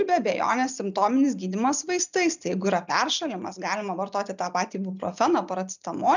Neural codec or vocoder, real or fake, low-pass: none; real; 7.2 kHz